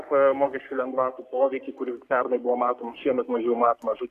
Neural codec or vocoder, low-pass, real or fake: codec, 44.1 kHz, 3.4 kbps, Pupu-Codec; 14.4 kHz; fake